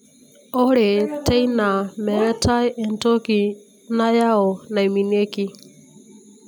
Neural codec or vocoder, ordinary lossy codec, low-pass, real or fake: none; none; none; real